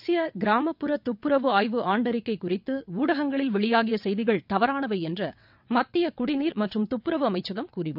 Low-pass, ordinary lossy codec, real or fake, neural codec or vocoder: 5.4 kHz; none; fake; vocoder, 22.05 kHz, 80 mel bands, WaveNeXt